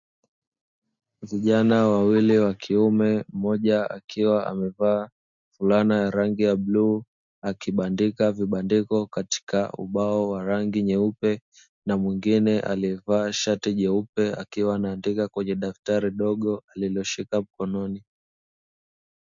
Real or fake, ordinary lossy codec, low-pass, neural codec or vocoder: real; MP3, 64 kbps; 7.2 kHz; none